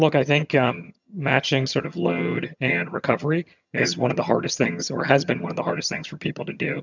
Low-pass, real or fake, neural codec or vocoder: 7.2 kHz; fake; vocoder, 22.05 kHz, 80 mel bands, HiFi-GAN